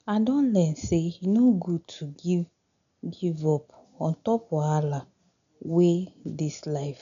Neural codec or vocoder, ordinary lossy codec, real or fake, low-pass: none; none; real; 7.2 kHz